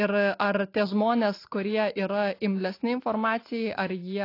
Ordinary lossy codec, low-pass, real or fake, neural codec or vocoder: AAC, 32 kbps; 5.4 kHz; real; none